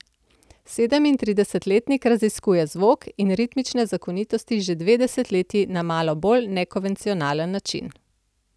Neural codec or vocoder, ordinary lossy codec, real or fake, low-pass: none; none; real; none